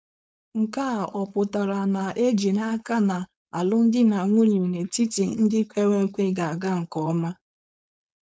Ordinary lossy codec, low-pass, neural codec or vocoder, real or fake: none; none; codec, 16 kHz, 4.8 kbps, FACodec; fake